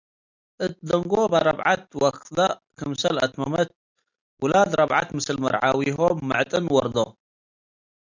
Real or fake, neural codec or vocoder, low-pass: real; none; 7.2 kHz